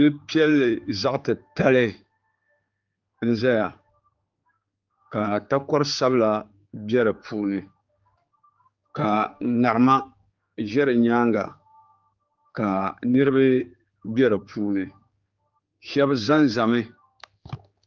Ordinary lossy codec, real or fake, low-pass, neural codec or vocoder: Opus, 24 kbps; fake; 7.2 kHz; codec, 16 kHz, 4 kbps, X-Codec, HuBERT features, trained on general audio